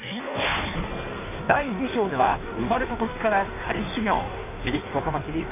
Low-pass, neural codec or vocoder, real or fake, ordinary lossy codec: 3.6 kHz; codec, 16 kHz in and 24 kHz out, 1.1 kbps, FireRedTTS-2 codec; fake; none